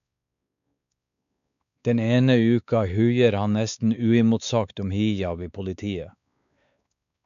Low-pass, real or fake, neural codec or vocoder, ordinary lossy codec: 7.2 kHz; fake; codec, 16 kHz, 4 kbps, X-Codec, WavLM features, trained on Multilingual LibriSpeech; none